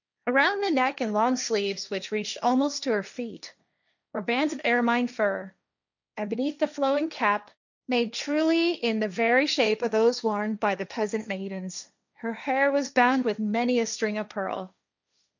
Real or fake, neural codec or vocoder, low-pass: fake; codec, 16 kHz, 1.1 kbps, Voila-Tokenizer; 7.2 kHz